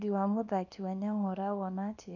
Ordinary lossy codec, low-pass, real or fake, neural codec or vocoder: none; 7.2 kHz; fake; codec, 24 kHz, 0.9 kbps, WavTokenizer, small release